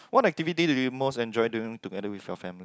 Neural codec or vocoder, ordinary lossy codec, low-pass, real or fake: none; none; none; real